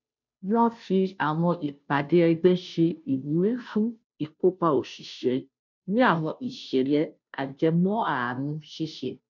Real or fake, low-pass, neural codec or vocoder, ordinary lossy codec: fake; 7.2 kHz; codec, 16 kHz, 0.5 kbps, FunCodec, trained on Chinese and English, 25 frames a second; none